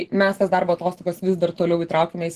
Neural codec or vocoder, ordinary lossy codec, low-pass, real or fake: none; Opus, 16 kbps; 14.4 kHz; real